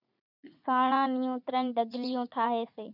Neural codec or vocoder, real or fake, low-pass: vocoder, 44.1 kHz, 80 mel bands, Vocos; fake; 5.4 kHz